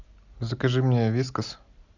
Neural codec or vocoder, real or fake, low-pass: none; real; 7.2 kHz